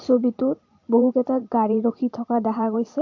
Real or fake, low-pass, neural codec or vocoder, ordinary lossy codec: fake; 7.2 kHz; vocoder, 44.1 kHz, 128 mel bands every 512 samples, BigVGAN v2; AAC, 48 kbps